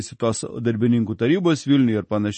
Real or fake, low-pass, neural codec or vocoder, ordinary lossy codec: real; 10.8 kHz; none; MP3, 32 kbps